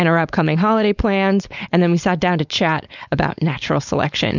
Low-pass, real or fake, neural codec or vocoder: 7.2 kHz; fake; codec, 16 kHz, 4.8 kbps, FACodec